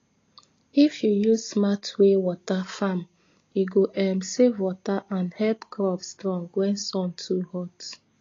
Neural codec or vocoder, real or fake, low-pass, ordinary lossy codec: none; real; 7.2 kHz; AAC, 32 kbps